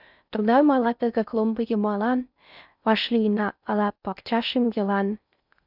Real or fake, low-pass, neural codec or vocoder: fake; 5.4 kHz; codec, 16 kHz in and 24 kHz out, 0.6 kbps, FocalCodec, streaming, 4096 codes